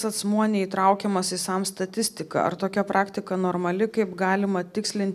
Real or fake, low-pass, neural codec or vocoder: real; 14.4 kHz; none